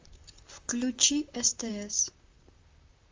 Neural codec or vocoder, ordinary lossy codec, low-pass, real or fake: vocoder, 44.1 kHz, 128 mel bands, Pupu-Vocoder; Opus, 32 kbps; 7.2 kHz; fake